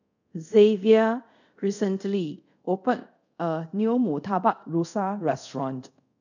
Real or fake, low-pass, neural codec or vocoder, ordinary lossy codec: fake; 7.2 kHz; codec, 24 kHz, 0.5 kbps, DualCodec; none